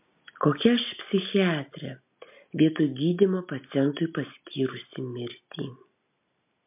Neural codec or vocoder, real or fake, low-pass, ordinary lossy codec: none; real; 3.6 kHz; MP3, 32 kbps